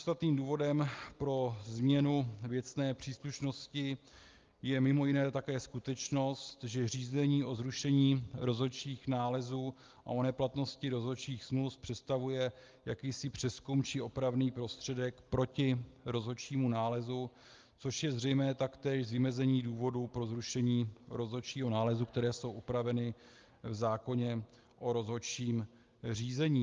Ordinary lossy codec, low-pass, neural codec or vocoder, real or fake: Opus, 32 kbps; 7.2 kHz; none; real